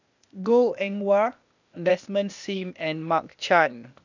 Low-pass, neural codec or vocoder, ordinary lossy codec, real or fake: 7.2 kHz; codec, 16 kHz, 0.8 kbps, ZipCodec; none; fake